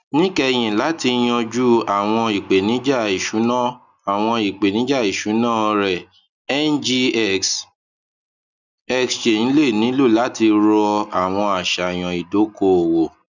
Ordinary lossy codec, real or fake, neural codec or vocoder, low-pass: none; real; none; 7.2 kHz